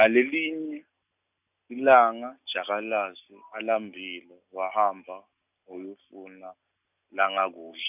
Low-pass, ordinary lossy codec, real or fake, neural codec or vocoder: 3.6 kHz; none; real; none